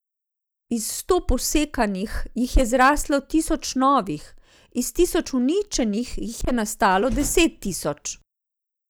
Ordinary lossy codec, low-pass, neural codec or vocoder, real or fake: none; none; none; real